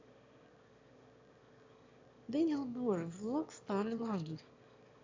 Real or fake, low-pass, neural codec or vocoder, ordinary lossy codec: fake; 7.2 kHz; autoencoder, 22.05 kHz, a latent of 192 numbers a frame, VITS, trained on one speaker; MP3, 64 kbps